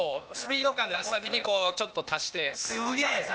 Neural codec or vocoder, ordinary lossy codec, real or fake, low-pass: codec, 16 kHz, 0.8 kbps, ZipCodec; none; fake; none